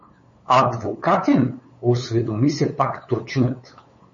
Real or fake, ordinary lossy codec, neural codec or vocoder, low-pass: fake; MP3, 32 kbps; codec, 16 kHz, 8 kbps, FunCodec, trained on LibriTTS, 25 frames a second; 7.2 kHz